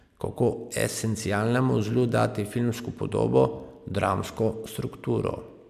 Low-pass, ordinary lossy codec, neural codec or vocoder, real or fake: 14.4 kHz; MP3, 96 kbps; none; real